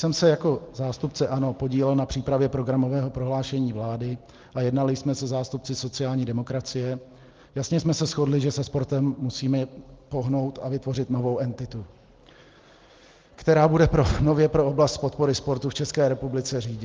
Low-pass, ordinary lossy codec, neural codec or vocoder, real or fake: 7.2 kHz; Opus, 32 kbps; none; real